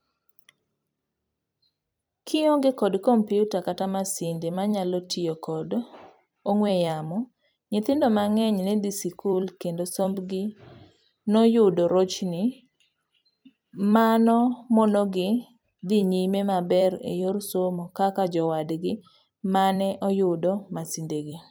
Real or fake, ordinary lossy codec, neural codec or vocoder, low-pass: fake; none; vocoder, 44.1 kHz, 128 mel bands every 256 samples, BigVGAN v2; none